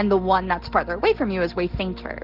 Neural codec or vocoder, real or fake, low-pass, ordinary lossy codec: none; real; 5.4 kHz; Opus, 16 kbps